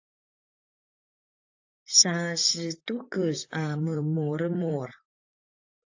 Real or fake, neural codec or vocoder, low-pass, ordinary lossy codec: fake; vocoder, 44.1 kHz, 128 mel bands, Pupu-Vocoder; 7.2 kHz; AAC, 48 kbps